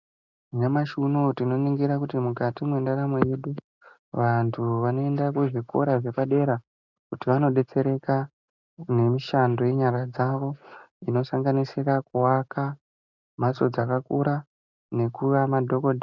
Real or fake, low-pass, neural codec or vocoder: real; 7.2 kHz; none